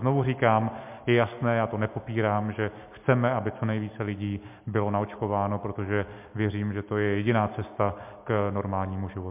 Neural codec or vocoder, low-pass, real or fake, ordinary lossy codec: none; 3.6 kHz; real; MP3, 32 kbps